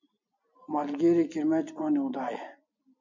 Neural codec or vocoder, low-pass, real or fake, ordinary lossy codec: none; 7.2 kHz; real; MP3, 64 kbps